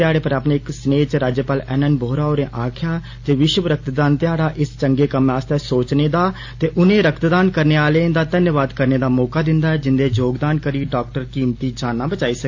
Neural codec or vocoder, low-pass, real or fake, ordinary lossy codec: none; 7.2 kHz; real; AAC, 48 kbps